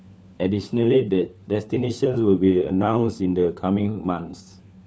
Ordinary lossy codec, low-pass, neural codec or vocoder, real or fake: none; none; codec, 16 kHz, 4 kbps, FunCodec, trained on LibriTTS, 50 frames a second; fake